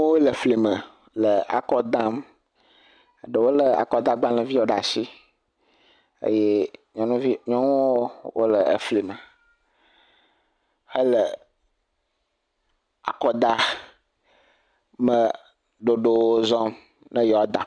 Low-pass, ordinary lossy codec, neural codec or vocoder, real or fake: 9.9 kHz; MP3, 96 kbps; none; real